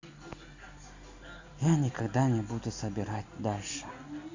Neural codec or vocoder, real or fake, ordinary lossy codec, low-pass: none; real; Opus, 64 kbps; 7.2 kHz